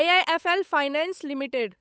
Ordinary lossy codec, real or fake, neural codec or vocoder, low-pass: none; fake; codec, 16 kHz, 8 kbps, FunCodec, trained on Chinese and English, 25 frames a second; none